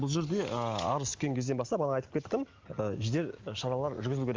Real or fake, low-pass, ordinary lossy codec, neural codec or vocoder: real; 7.2 kHz; Opus, 32 kbps; none